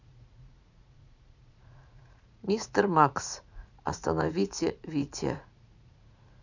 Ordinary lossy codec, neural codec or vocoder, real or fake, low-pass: none; vocoder, 44.1 kHz, 80 mel bands, Vocos; fake; 7.2 kHz